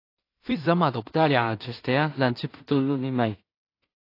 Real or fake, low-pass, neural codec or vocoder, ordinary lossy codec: fake; 5.4 kHz; codec, 16 kHz in and 24 kHz out, 0.4 kbps, LongCat-Audio-Codec, two codebook decoder; AAC, 32 kbps